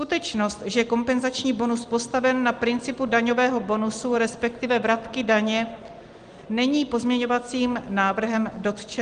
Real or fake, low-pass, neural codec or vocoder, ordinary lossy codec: real; 9.9 kHz; none; Opus, 24 kbps